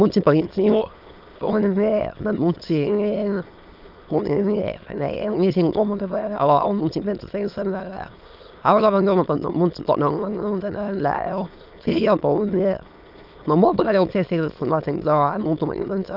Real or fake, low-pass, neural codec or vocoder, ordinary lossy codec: fake; 5.4 kHz; autoencoder, 22.05 kHz, a latent of 192 numbers a frame, VITS, trained on many speakers; Opus, 24 kbps